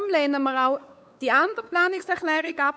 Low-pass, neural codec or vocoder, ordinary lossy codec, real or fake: none; codec, 16 kHz, 4 kbps, X-Codec, WavLM features, trained on Multilingual LibriSpeech; none; fake